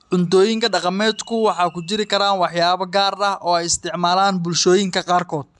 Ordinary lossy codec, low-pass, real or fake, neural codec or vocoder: AAC, 96 kbps; 10.8 kHz; real; none